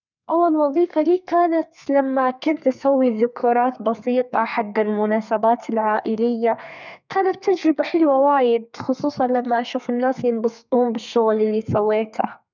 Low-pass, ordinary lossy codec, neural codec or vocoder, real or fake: 7.2 kHz; none; codec, 32 kHz, 1.9 kbps, SNAC; fake